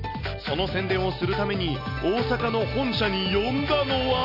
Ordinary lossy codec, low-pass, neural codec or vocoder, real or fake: none; 5.4 kHz; none; real